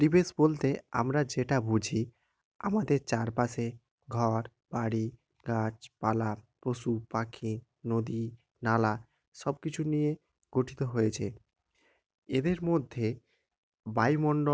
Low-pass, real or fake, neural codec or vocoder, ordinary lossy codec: none; real; none; none